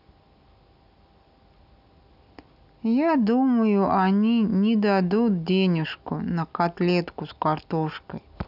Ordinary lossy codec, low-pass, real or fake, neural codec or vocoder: none; 5.4 kHz; real; none